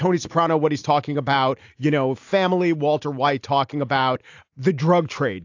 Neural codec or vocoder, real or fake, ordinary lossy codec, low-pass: none; real; AAC, 48 kbps; 7.2 kHz